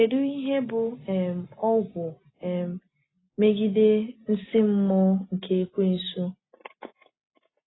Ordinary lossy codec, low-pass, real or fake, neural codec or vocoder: AAC, 16 kbps; 7.2 kHz; real; none